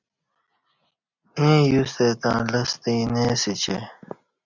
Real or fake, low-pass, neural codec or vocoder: real; 7.2 kHz; none